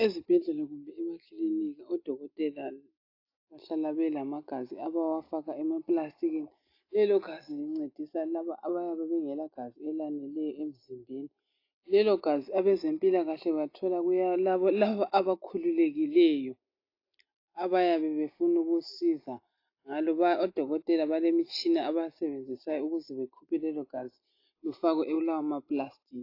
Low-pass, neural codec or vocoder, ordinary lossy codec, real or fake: 5.4 kHz; none; AAC, 32 kbps; real